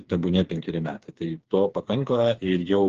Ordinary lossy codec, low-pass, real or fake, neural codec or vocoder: Opus, 16 kbps; 7.2 kHz; fake; codec, 16 kHz, 4 kbps, FreqCodec, smaller model